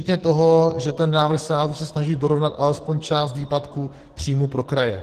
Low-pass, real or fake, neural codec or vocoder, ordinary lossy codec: 14.4 kHz; fake; codec, 44.1 kHz, 2.6 kbps, SNAC; Opus, 16 kbps